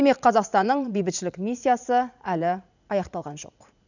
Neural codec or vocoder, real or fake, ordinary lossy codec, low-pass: vocoder, 44.1 kHz, 80 mel bands, Vocos; fake; none; 7.2 kHz